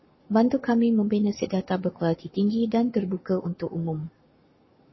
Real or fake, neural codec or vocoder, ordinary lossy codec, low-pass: fake; codec, 44.1 kHz, 7.8 kbps, Pupu-Codec; MP3, 24 kbps; 7.2 kHz